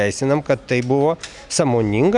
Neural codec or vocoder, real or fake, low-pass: none; real; 10.8 kHz